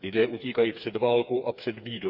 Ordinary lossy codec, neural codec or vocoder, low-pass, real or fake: none; codec, 16 kHz, 4 kbps, FreqCodec, smaller model; 5.4 kHz; fake